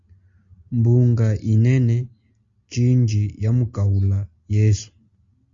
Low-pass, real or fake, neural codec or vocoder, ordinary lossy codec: 7.2 kHz; real; none; Opus, 64 kbps